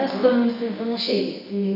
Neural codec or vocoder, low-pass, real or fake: codec, 24 kHz, 0.9 kbps, WavTokenizer, medium music audio release; 5.4 kHz; fake